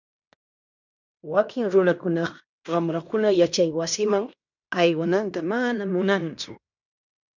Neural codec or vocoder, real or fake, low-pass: codec, 16 kHz in and 24 kHz out, 0.9 kbps, LongCat-Audio-Codec, fine tuned four codebook decoder; fake; 7.2 kHz